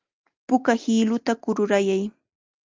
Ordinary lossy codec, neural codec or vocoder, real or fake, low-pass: Opus, 32 kbps; none; real; 7.2 kHz